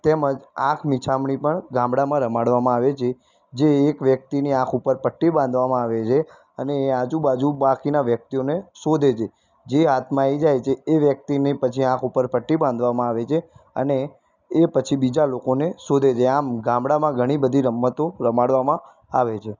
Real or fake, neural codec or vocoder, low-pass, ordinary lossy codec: real; none; 7.2 kHz; none